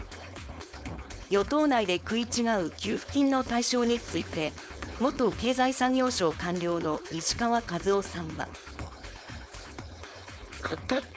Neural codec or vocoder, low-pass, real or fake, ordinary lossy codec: codec, 16 kHz, 4.8 kbps, FACodec; none; fake; none